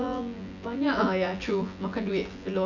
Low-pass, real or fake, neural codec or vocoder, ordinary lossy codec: 7.2 kHz; fake; vocoder, 24 kHz, 100 mel bands, Vocos; none